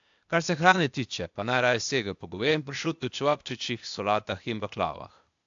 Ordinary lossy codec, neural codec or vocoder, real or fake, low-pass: AAC, 64 kbps; codec, 16 kHz, 0.8 kbps, ZipCodec; fake; 7.2 kHz